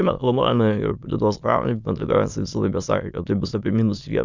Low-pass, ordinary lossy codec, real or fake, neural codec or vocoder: 7.2 kHz; Opus, 64 kbps; fake; autoencoder, 22.05 kHz, a latent of 192 numbers a frame, VITS, trained on many speakers